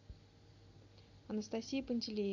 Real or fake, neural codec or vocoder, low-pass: real; none; 7.2 kHz